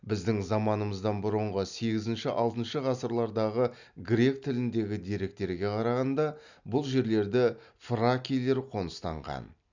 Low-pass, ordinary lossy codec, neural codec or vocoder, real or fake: 7.2 kHz; none; none; real